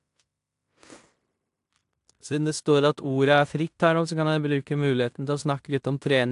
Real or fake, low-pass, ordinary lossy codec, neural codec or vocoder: fake; 10.8 kHz; AAC, 64 kbps; codec, 16 kHz in and 24 kHz out, 0.9 kbps, LongCat-Audio-Codec, fine tuned four codebook decoder